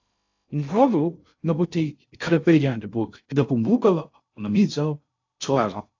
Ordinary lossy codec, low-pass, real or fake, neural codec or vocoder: AAC, 48 kbps; 7.2 kHz; fake; codec, 16 kHz in and 24 kHz out, 0.6 kbps, FocalCodec, streaming, 2048 codes